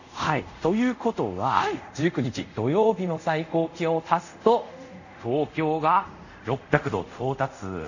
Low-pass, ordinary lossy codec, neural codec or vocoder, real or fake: 7.2 kHz; none; codec, 24 kHz, 0.5 kbps, DualCodec; fake